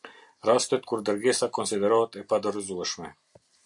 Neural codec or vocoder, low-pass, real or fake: none; 10.8 kHz; real